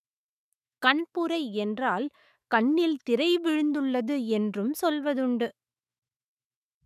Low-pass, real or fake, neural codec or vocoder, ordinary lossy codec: 14.4 kHz; fake; autoencoder, 48 kHz, 128 numbers a frame, DAC-VAE, trained on Japanese speech; none